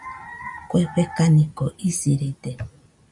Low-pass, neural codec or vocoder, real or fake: 10.8 kHz; none; real